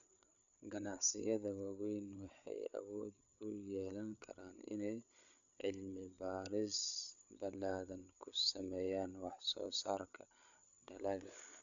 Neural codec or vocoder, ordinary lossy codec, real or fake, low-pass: codec, 16 kHz, 8 kbps, FreqCodec, larger model; none; fake; 7.2 kHz